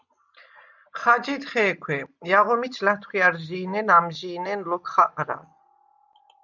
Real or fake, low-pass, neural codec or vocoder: real; 7.2 kHz; none